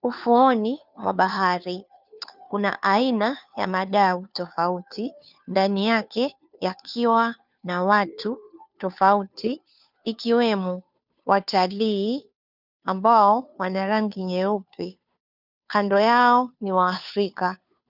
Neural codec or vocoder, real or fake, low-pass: codec, 16 kHz, 2 kbps, FunCodec, trained on Chinese and English, 25 frames a second; fake; 5.4 kHz